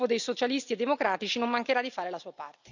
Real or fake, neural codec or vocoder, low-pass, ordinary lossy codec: real; none; 7.2 kHz; none